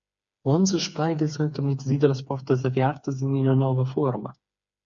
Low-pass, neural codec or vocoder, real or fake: 7.2 kHz; codec, 16 kHz, 4 kbps, FreqCodec, smaller model; fake